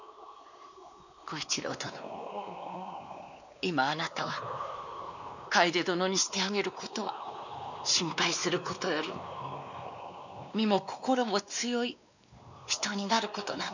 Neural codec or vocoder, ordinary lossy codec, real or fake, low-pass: codec, 16 kHz, 2 kbps, X-Codec, WavLM features, trained on Multilingual LibriSpeech; none; fake; 7.2 kHz